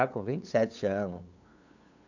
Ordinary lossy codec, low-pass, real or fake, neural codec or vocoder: none; 7.2 kHz; fake; codec, 16 kHz, 8 kbps, FunCodec, trained on LibriTTS, 25 frames a second